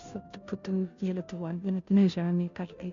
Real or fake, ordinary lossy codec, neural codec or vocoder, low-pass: fake; MP3, 64 kbps; codec, 16 kHz, 0.5 kbps, FunCodec, trained on Chinese and English, 25 frames a second; 7.2 kHz